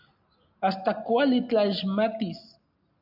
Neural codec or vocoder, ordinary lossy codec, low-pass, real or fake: none; AAC, 48 kbps; 5.4 kHz; real